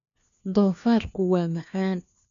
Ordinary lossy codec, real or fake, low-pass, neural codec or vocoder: none; fake; 7.2 kHz; codec, 16 kHz, 1 kbps, FunCodec, trained on LibriTTS, 50 frames a second